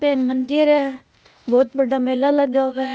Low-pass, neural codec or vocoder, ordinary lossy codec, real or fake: none; codec, 16 kHz, 0.8 kbps, ZipCodec; none; fake